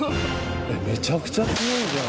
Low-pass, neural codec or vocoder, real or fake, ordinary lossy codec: none; none; real; none